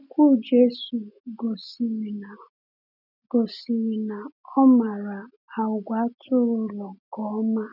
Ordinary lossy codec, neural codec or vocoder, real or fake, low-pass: none; none; real; 5.4 kHz